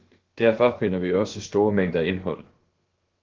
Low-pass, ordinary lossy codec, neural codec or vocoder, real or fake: 7.2 kHz; Opus, 16 kbps; codec, 16 kHz, about 1 kbps, DyCAST, with the encoder's durations; fake